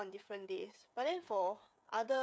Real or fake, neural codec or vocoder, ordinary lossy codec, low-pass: fake; codec, 16 kHz, 16 kbps, FreqCodec, smaller model; none; none